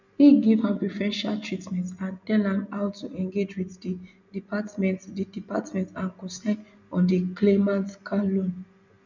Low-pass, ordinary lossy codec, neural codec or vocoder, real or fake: 7.2 kHz; none; none; real